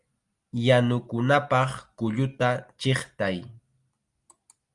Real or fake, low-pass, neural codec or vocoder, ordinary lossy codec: real; 10.8 kHz; none; Opus, 32 kbps